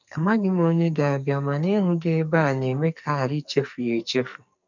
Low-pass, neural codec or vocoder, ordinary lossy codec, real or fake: 7.2 kHz; codec, 44.1 kHz, 2.6 kbps, SNAC; none; fake